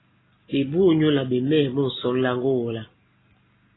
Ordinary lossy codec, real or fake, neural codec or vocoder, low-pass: AAC, 16 kbps; real; none; 7.2 kHz